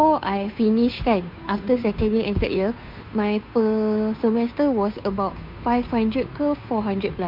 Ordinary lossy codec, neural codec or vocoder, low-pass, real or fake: MP3, 48 kbps; codec, 16 kHz, 2 kbps, FunCodec, trained on Chinese and English, 25 frames a second; 5.4 kHz; fake